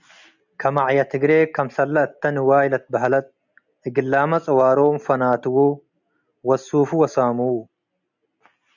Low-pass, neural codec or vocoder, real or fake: 7.2 kHz; none; real